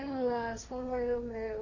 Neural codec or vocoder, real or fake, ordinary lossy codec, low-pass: codec, 16 kHz, 1.1 kbps, Voila-Tokenizer; fake; none; 7.2 kHz